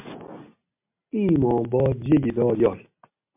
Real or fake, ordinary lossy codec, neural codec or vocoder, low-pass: real; MP3, 32 kbps; none; 3.6 kHz